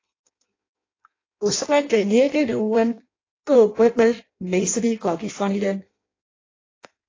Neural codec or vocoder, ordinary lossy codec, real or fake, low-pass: codec, 16 kHz in and 24 kHz out, 0.6 kbps, FireRedTTS-2 codec; AAC, 32 kbps; fake; 7.2 kHz